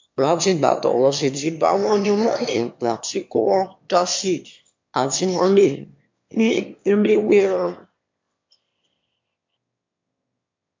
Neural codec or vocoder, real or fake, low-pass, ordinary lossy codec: autoencoder, 22.05 kHz, a latent of 192 numbers a frame, VITS, trained on one speaker; fake; 7.2 kHz; MP3, 48 kbps